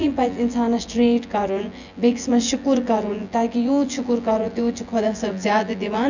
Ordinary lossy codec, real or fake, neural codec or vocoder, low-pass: none; fake; vocoder, 24 kHz, 100 mel bands, Vocos; 7.2 kHz